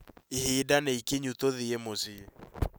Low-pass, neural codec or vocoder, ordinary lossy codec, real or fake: none; none; none; real